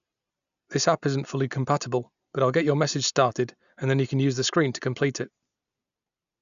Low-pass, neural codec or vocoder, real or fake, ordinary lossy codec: 7.2 kHz; none; real; none